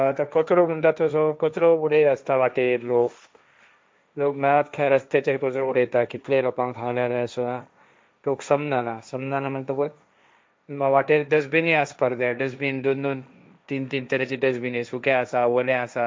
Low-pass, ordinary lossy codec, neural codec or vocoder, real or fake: none; none; codec, 16 kHz, 1.1 kbps, Voila-Tokenizer; fake